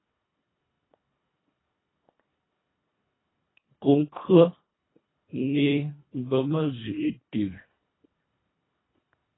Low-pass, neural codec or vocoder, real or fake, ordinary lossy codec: 7.2 kHz; codec, 24 kHz, 1.5 kbps, HILCodec; fake; AAC, 16 kbps